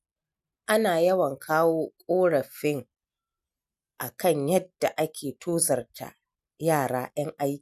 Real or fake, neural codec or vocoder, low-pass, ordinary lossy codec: real; none; 14.4 kHz; none